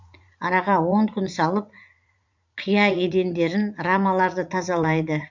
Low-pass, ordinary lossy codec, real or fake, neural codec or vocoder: 7.2 kHz; MP3, 64 kbps; fake; vocoder, 44.1 kHz, 80 mel bands, Vocos